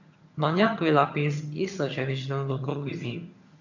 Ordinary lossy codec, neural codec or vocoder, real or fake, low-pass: none; vocoder, 22.05 kHz, 80 mel bands, HiFi-GAN; fake; 7.2 kHz